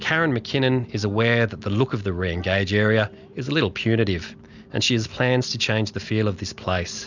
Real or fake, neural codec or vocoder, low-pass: real; none; 7.2 kHz